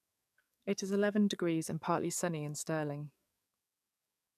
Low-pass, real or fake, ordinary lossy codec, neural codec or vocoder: 14.4 kHz; fake; none; codec, 44.1 kHz, 7.8 kbps, DAC